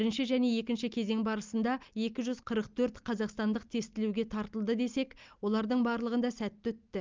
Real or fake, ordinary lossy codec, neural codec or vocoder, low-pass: real; Opus, 24 kbps; none; 7.2 kHz